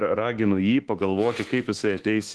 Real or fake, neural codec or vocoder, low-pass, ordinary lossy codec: fake; codec, 24 kHz, 1.2 kbps, DualCodec; 10.8 kHz; Opus, 16 kbps